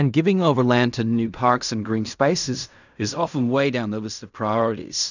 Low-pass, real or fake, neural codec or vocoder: 7.2 kHz; fake; codec, 16 kHz in and 24 kHz out, 0.4 kbps, LongCat-Audio-Codec, fine tuned four codebook decoder